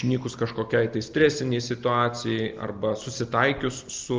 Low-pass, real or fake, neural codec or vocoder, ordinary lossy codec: 7.2 kHz; real; none; Opus, 32 kbps